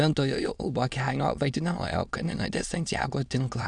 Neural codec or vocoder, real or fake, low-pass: autoencoder, 22.05 kHz, a latent of 192 numbers a frame, VITS, trained on many speakers; fake; 9.9 kHz